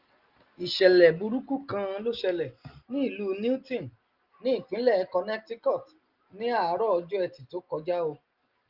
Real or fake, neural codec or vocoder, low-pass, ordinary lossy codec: real; none; 5.4 kHz; Opus, 32 kbps